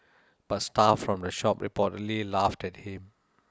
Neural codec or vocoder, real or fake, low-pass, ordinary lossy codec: none; real; none; none